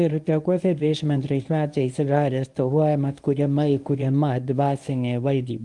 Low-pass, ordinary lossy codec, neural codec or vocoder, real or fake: 10.8 kHz; Opus, 32 kbps; codec, 24 kHz, 0.9 kbps, WavTokenizer, medium speech release version 1; fake